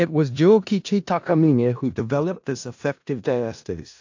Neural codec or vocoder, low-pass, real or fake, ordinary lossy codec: codec, 16 kHz in and 24 kHz out, 0.4 kbps, LongCat-Audio-Codec, four codebook decoder; 7.2 kHz; fake; AAC, 48 kbps